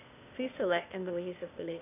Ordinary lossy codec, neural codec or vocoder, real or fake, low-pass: none; codec, 16 kHz, 0.8 kbps, ZipCodec; fake; 3.6 kHz